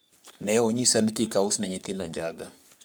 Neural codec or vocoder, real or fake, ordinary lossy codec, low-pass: codec, 44.1 kHz, 3.4 kbps, Pupu-Codec; fake; none; none